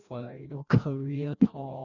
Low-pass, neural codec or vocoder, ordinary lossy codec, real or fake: 7.2 kHz; codec, 16 kHz, 1 kbps, FreqCodec, larger model; none; fake